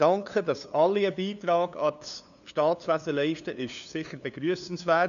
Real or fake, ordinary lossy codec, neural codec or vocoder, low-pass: fake; none; codec, 16 kHz, 2 kbps, FunCodec, trained on LibriTTS, 25 frames a second; 7.2 kHz